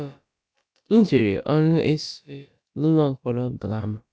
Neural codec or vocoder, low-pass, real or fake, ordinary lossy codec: codec, 16 kHz, about 1 kbps, DyCAST, with the encoder's durations; none; fake; none